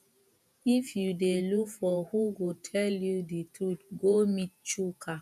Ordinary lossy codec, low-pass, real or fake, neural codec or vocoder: none; 14.4 kHz; fake; vocoder, 48 kHz, 128 mel bands, Vocos